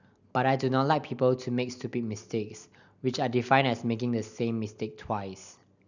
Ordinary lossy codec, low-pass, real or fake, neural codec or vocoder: none; 7.2 kHz; real; none